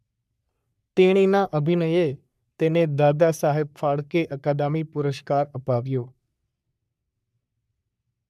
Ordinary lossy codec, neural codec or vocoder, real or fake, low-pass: none; codec, 44.1 kHz, 3.4 kbps, Pupu-Codec; fake; 14.4 kHz